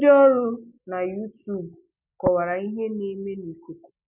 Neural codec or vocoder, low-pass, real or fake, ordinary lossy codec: none; 3.6 kHz; real; none